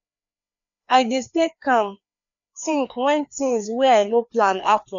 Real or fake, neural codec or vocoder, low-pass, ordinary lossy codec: fake; codec, 16 kHz, 2 kbps, FreqCodec, larger model; 7.2 kHz; none